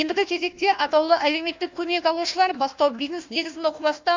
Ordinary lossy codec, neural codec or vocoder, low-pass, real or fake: MP3, 64 kbps; codec, 16 kHz, 1 kbps, FunCodec, trained on Chinese and English, 50 frames a second; 7.2 kHz; fake